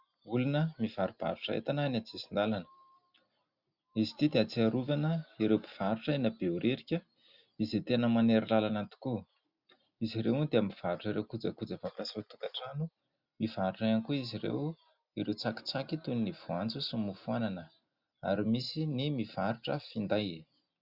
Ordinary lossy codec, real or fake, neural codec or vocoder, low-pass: Opus, 64 kbps; real; none; 5.4 kHz